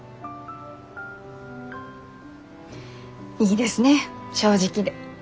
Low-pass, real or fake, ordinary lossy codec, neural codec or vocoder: none; real; none; none